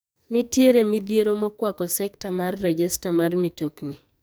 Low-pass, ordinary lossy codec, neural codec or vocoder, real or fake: none; none; codec, 44.1 kHz, 2.6 kbps, SNAC; fake